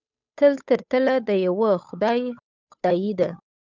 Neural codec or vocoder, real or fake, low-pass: codec, 16 kHz, 8 kbps, FunCodec, trained on Chinese and English, 25 frames a second; fake; 7.2 kHz